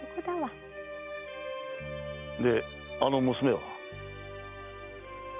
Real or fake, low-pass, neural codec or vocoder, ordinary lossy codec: real; 3.6 kHz; none; none